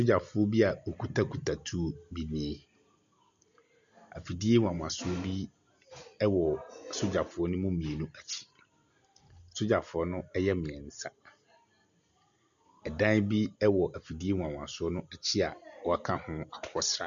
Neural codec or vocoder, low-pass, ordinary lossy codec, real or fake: none; 7.2 kHz; MP3, 96 kbps; real